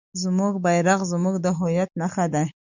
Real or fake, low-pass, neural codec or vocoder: real; 7.2 kHz; none